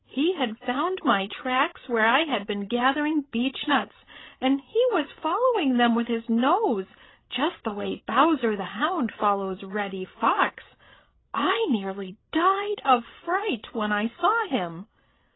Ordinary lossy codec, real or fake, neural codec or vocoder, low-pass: AAC, 16 kbps; fake; codec, 16 kHz, 16 kbps, FreqCodec, larger model; 7.2 kHz